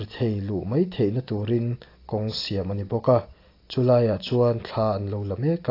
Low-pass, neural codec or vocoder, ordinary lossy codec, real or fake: 5.4 kHz; none; AAC, 32 kbps; real